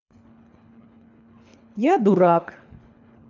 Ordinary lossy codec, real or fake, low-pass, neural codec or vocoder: none; fake; 7.2 kHz; codec, 24 kHz, 3 kbps, HILCodec